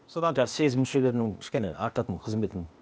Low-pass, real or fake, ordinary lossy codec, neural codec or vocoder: none; fake; none; codec, 16 kHz, 0.8 kbps, ZipCodec